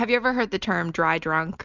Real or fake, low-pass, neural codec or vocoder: real; 7.2 kHz; none